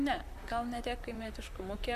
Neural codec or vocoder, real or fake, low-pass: vocoder, 44.1 kHz, 128 mel bands, Pupu-Vocoder; fake; 14.4 kHz